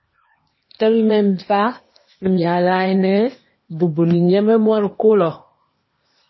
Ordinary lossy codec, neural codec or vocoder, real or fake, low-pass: MP3, 24 kbps; codec, 16 kHz, 0.8 kbps, ZipCodec; fake; 7.2 kHz